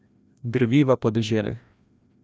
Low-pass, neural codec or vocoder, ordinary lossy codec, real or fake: none; codec, 16 kHz, 1 kbps, FreqCodec, larger model; none; fake